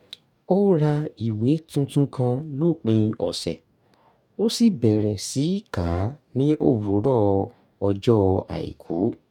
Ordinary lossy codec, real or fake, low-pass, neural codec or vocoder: none; fake; 19.8 kHz; codec, 44.1 kHz, 2.6 kbps, DAC